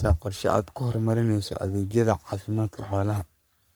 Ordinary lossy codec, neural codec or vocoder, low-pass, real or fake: none; codec, 44.1 kHz, 3.4 kbps, Pupu-Codec; none; fake